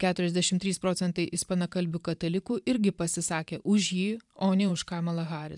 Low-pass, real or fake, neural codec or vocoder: 10.8 kHz; fake; vocoder, 44.1 kHz, 128 mel bands every 512 samples, BigVGAN v2